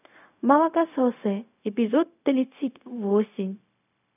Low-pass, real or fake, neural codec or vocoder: 3.6 kHz; fake; codec, 16 kHz, 0.4 kbps, LongCat-Audio-Codec